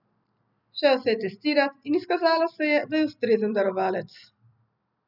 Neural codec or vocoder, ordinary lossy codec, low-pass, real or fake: none; none; 5.4 kHz; real